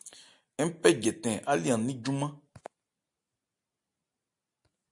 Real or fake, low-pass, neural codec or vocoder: real; 10.8 kHz; none